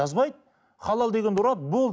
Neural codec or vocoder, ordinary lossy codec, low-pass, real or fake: none; none; none; real